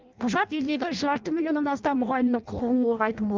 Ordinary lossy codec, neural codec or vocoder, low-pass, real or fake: Opus, 24 kbps; codec, 16 kHz in and 24 kHz out, 0.6 kbps, FireRedTTS-2 codec; 7.2 kHz; fake